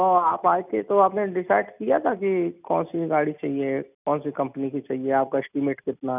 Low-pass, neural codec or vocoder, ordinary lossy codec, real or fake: 3.6 kHz; none; none; real